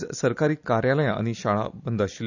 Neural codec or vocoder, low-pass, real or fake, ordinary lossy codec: none; 7.2 kHz; real; none